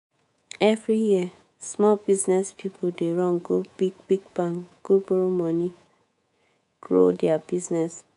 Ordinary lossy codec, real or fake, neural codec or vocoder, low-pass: none; fake; codec, 24 kHz, 3.1 kbps, DualCodec; 10.8 kHz